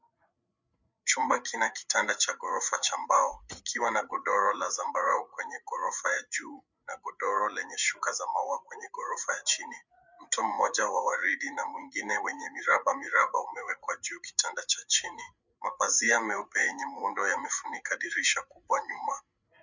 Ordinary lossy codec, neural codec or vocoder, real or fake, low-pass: Opus, 64 kbps; codec, 16 kHz, 8 kbps, FreqCodec, larger model; fake; 7.2 kHz